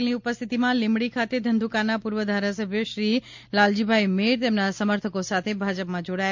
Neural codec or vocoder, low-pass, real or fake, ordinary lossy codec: none; 7.2 kHz; real; MP3, 48 kbps